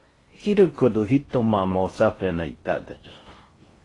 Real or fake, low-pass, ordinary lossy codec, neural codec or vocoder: fake; 10.8 kHz; AAC, 32 kbps; codec, 16 kHz in and 24 kHz out, 0.6 kbps, FocalCodec, streaming, 4096 codes